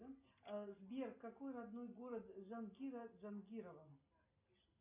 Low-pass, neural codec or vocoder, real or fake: 3.6 kHz; none; real